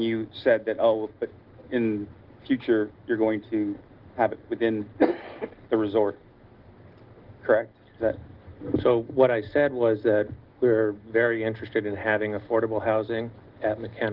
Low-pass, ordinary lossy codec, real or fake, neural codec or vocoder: 5.4 kHz; Opus, 16 kbps; real; none